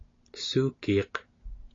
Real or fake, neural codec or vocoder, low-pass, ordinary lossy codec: real; none; 7.2 kHz; AAC, 32 kbps